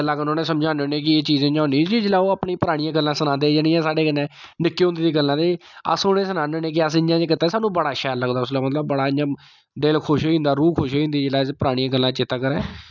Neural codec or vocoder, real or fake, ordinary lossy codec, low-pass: none; real; none; 7.2 kHz